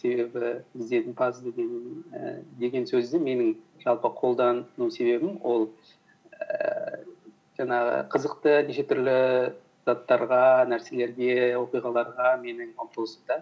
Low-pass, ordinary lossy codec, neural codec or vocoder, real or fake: none; none; none; real